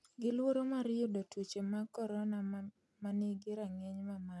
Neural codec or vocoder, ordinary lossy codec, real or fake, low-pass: none; none; real; none